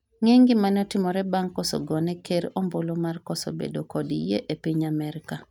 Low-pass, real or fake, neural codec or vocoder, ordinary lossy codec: 19.8 kHz; real; none; none